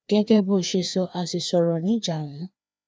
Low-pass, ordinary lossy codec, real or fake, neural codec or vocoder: none; none; fake; codec, 16 kHz, 2 kbps, FreqCodec, larger model